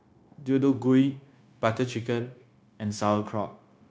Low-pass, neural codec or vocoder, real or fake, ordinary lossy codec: none; codec, 16 kHz, 0.9 kbps, LongCat-Audio-Codec; fake; none